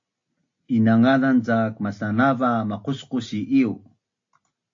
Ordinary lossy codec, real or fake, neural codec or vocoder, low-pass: AAC, 48 kbps; real; none; 7.2 kHz